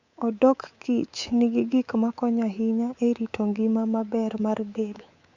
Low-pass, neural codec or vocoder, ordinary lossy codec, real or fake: 7.2 kHz; codec, 24 kHz, 3.1 kbps, DualCodec; Opus, 64 kbps; fake